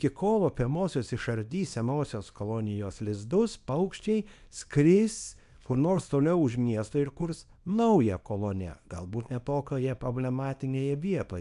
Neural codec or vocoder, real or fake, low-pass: codec, 24 kHz, 0.9 kbps, WavTokenizer, small release; fake; 10.8 kHz